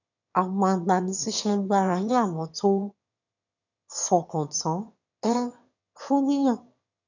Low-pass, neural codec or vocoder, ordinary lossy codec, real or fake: 7.2 kHz; autoencoder, 22.05 kHz, a latent of 192 numbers a frame, VITS, trained on one speaker; none; fake